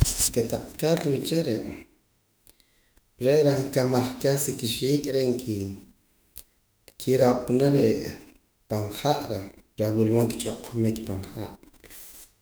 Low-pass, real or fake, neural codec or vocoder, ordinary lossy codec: none; fake; autoencoder, 48 kHz, 32 numbers a frame, DAC-VAE, trained on Japanese speech; none